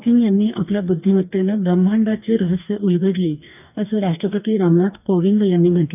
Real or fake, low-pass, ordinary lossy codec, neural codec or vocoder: fake; 3.6 kHz; none; codec, 44.1 kHz, 2.6 kbps, DAC